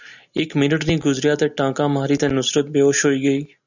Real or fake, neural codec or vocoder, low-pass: real; none; 7.2 kHz